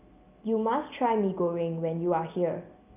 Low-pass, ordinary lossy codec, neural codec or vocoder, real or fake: 3.6 kHz; none; none; real